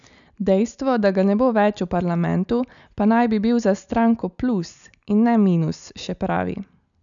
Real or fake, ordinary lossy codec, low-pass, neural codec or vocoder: real; none; 7.2 kHz; none